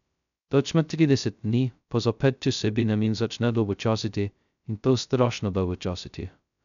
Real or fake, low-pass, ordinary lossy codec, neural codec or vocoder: fake; 7.2 kHz; none; codec, 16 kHz, 0.2 kbps, FocalCodec